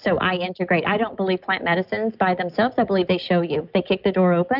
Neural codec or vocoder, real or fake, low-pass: none; real; 5.4 kHz